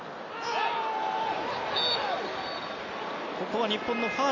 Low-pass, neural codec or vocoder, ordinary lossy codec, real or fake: 7.2 kHz; none; none; real